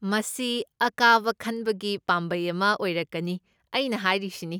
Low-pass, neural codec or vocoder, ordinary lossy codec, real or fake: none; none; none; real